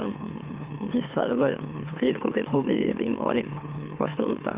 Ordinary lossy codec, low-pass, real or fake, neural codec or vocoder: Opus, 32 kbps; 3.6 kHz; fake; autoencoder, 44.1 kHz, a latent of 192 numbers a frame, MeloTTS